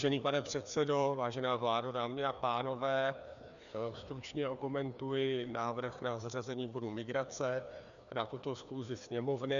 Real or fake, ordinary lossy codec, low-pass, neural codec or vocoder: fake; MP3, 96 kbps; 7.2 kHz; codec, 16 kHz, 2 kbps, FreqCodec, larger model